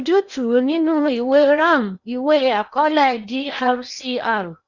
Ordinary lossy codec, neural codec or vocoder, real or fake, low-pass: none; codec, 16 kHz in and 24 kHz out, 0.8 kbps, FocalCodec, streaming, 65536 codes; fake; 7.2 kHz